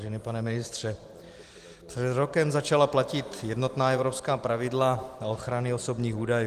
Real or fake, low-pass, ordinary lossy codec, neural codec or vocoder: real; 14.4 kHz; Opus, 24 kbps; none